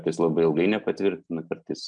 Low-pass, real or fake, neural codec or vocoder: 9.9 kHz; real; none